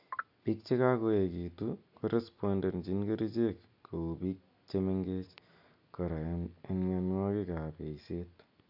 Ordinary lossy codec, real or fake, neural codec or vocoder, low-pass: AAC, 48 kbps; real; none; 5.4 kHz